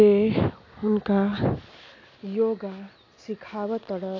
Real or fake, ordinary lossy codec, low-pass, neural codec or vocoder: real; AAC, 32 kbps; 7.2 kHz; none